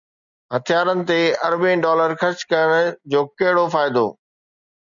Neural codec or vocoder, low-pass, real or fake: none; 7.2 kHz; real